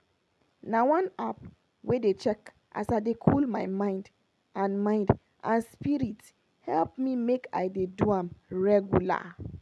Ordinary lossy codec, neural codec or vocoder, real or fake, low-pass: none; none; real; none